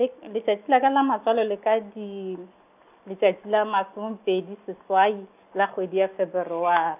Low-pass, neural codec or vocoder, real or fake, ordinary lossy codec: 3.6 kHz; none; real; none